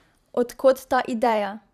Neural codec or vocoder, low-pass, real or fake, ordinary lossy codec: vocoder, 44.1 kHz, 128 mel bands every 512 samples, BigVGAN v2; 14.4 kHz; fake; none